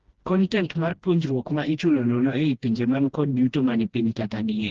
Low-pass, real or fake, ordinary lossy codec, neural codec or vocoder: 7.2 kHz; fake; Opus, 16 kbps; codec, 16 kHz, 1 kbps, FreqCodec, smaller model